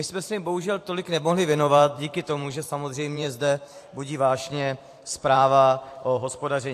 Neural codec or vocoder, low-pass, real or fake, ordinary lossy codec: vocoder, 44.1 kHz, 128 mel bands every 512 samples, BigVGAN v2; 14.4 kHz; fake; AAC, 64 kbps